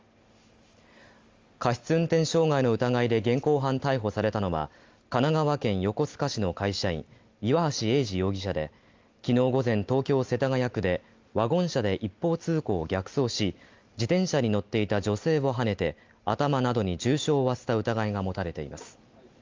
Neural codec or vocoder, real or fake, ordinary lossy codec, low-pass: none; real; Opus, 32 kbps; 7.2 kHz